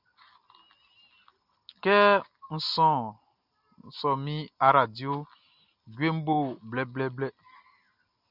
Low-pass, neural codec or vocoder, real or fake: 5.4 kHz; none; real